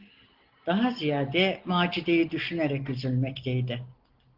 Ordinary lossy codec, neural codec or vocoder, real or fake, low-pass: Opus, 16 kbps; none; real; 5.4 kHz